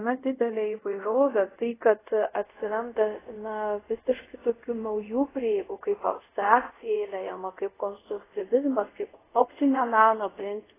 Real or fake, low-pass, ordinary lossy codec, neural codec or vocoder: fake; 3.6 kHz; AAC, 16 kbps; codec, 24 kHz, 0.5 kbps, DualCodec